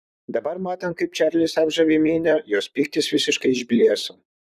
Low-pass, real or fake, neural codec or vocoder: 14.4 kHz; fake; vocoder, 44.1 kHz, 128 mel bands, Pupu-Vocoder